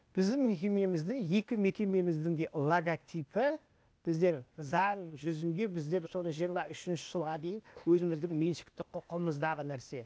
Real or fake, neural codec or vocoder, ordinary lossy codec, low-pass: fake; codec, 16 kHz, 0.8 kbps, ZipCodec; none; none